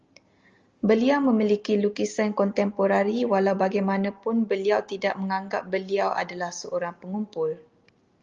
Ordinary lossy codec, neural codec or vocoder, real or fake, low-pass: Opus, 32 kbps; none; real; 7.2 kHz